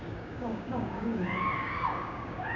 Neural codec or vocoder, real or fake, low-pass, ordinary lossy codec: codec, 16 kHz, 6 kbps, DAC; fake; 7.2 kHz; none